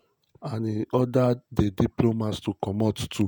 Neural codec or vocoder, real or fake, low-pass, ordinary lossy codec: vocoder, 48 kHz, 128 mel bands, Vocos; fake; none; none